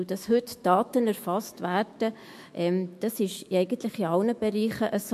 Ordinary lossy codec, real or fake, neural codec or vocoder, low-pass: MP3, 64 kbps; fake; autoencoder, 48 kHz, 128 numbers a frame, DAC-VAE, trained on Japanese speech; 14.4 kHz